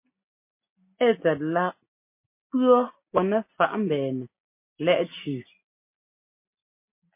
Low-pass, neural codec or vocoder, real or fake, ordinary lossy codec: 3.6 kHz; none; real; MP3, 24 kbps